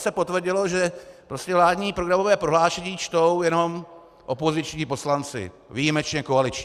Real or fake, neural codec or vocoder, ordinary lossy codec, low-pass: real; none; Opus, 64 kbps; 14.4 kHz